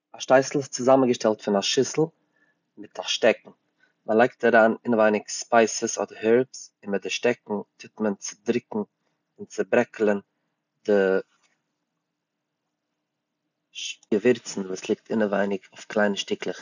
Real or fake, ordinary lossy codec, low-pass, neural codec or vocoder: real; none; 7.2 kHz; none